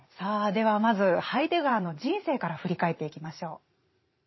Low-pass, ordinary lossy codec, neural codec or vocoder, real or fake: 7.2 kHz; MP3, 24 kbps; vocoder, 44.1 kHz, 128 mel bands every 512 samples, BigVGAN v2; fake